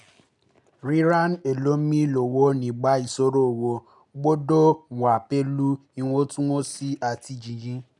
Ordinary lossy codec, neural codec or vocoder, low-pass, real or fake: none; none; 10.8 kHz; real